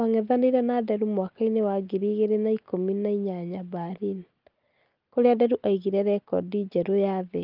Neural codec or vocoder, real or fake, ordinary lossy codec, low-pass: none; real; Opus, 24 kbps; 5.4 kHz